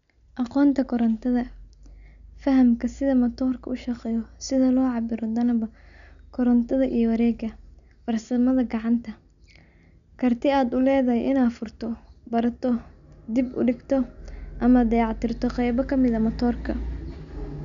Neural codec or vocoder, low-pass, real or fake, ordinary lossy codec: none; 7.2 kHz; real; MP3, 96 kbps